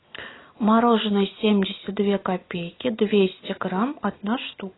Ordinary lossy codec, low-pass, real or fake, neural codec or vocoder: AAC, 16 kbps; 7.2 kHz; real; none